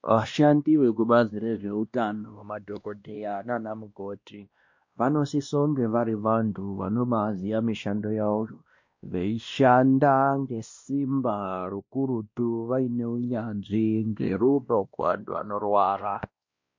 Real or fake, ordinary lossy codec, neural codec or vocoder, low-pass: fake; MP3, 48 kbps; codec, 16 kHz, 1 kbps, X-Codec, WavLM features, trained on Multilingual LibriSpeech; 7.2 kHz